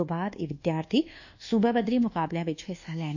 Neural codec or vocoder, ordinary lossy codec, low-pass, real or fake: codec, 24 kHz, 1.2 kbps, DualCodec; none; 7.2 kHz; fake